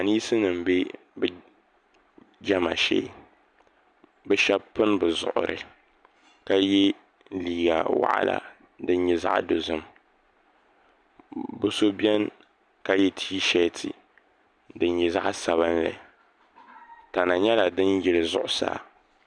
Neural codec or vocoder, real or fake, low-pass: none; real; 9.9 kHz